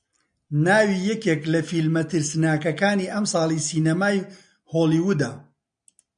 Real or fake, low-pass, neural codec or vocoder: real; 9.9 kHz; none